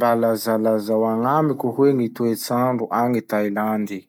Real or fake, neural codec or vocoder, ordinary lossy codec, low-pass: real; none; none; 19.8 kHz